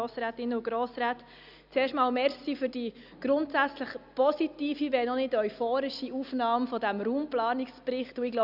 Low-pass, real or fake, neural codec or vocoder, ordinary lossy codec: 5.4 kHz; real; none; none